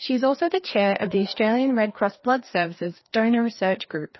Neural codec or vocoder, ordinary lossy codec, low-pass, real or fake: codec, 16 kHz, 2 kbps, FreqCodec, larger model; MP3, 24 kbps; 7.2 kHz; fake